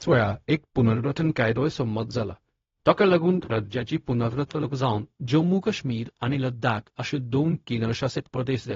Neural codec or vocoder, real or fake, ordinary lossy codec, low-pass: codec, 16 kHz, 0.4 kbps, LongCat-Audio-Codec; fake; AAC, 32 kbps; 7.2 kHz